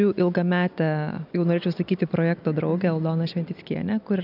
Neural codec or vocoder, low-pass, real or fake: none; 5.4 kHz; real